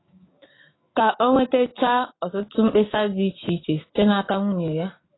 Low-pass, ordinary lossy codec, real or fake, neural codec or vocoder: 7.2 kHz; AAC, 16 kbps; fake; autoencoder, 48 kHz, 128 numbers a frame, DAC-VAE, trained on Japanese speech